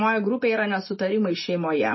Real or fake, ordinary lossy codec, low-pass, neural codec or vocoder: real; MP3, 24 kbps; 7.2 kHz; none